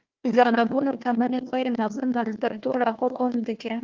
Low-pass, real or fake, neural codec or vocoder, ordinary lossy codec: 7.2 kHz; fake; codec, 16 kHz, 1 kbps, FunCodec, trained on Chinese and English, 50 frames a second; Opus, 32 kbps